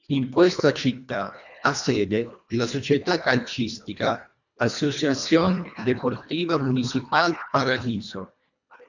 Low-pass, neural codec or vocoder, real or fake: 7.2 kHz; codec, 24 kHz, 1.5 kbps, HILCodec; fake